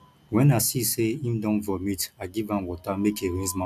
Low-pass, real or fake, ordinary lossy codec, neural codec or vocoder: 14.4 kHz; real; none; none